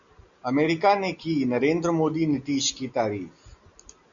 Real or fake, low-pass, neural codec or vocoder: real; 7.2 kHz; none